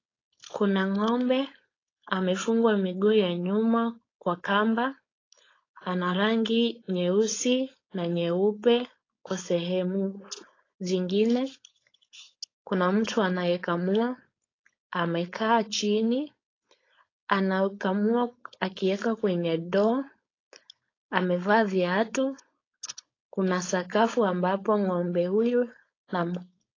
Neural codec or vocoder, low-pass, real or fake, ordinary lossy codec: codec, 16 kHz, 4.8 kbps, FACodec; 7.2 kHz; fake; AAC, 32 kbps